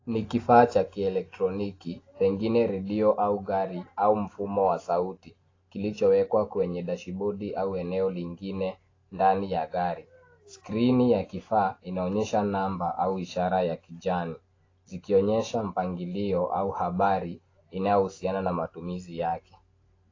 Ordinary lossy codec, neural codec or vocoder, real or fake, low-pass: AAC, 32 kbps; none; real; 7.2 kHz